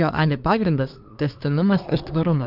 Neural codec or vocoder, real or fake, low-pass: codec, 24 kHz, 1 kbps, SNAC; fake; 5.4 kHz